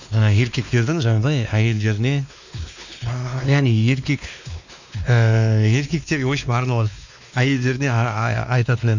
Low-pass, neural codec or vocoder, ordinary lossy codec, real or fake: 7.2 kHz; codec, 16 kHz, 2 kbps, X-Codec, WavLM features, trained on Multilingual LibriSpeech; none; fake